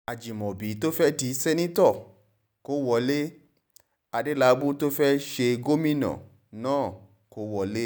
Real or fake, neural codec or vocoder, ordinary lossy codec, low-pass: real; none; none; none